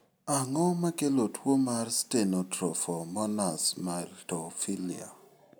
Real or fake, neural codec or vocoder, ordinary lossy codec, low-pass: real; none; none; none